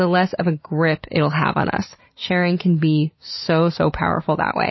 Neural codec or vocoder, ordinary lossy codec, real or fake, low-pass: none; MP3, 24 kbps; real; 7.2 kHz